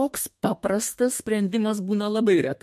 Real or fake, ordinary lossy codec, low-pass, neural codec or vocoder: fake; MP3, 64 kbps; 14.4 kHz; codec, 32 kHz, 1.9 kbps, SNAC